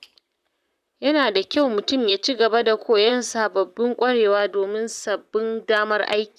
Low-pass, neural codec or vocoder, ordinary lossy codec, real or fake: 14.4 kHz; vocoder, 44.1 kHz, 128 mel bands, Pupu-Vocoder; none; fake